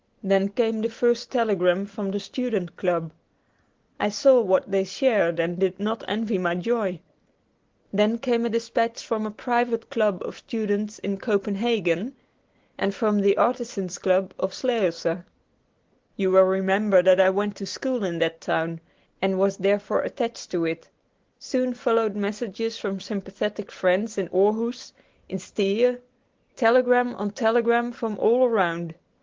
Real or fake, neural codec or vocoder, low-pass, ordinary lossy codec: real; none; 7.2 kHz; Opus, 16 kbps